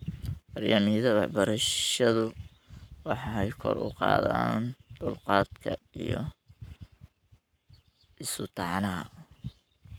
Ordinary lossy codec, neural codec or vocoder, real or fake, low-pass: none; codec, 44.1 kHz, 7.8 kbps, Pupu-Codec; fake; none